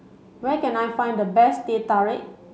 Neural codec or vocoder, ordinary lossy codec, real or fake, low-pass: none; none; real; none